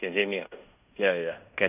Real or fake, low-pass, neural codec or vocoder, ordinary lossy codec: fake; 3.6 kHz; codec, 16 kHz in and 24 kHz out, 0.9 kbps, LongCat-Audio-Codec, fine tuned four codebook decoder; none